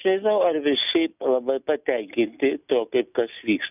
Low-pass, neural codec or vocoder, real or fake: 3.6 kHz; none; real